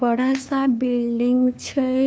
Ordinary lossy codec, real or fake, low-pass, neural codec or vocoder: none; fake; none; codec, 16 kHz, 2 kbps, FunCodec, trained on LibriTTS, 25 frames a second